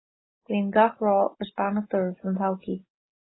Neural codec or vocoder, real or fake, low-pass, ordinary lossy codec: none; real; 7.2 kHz; AAC, 16 kbps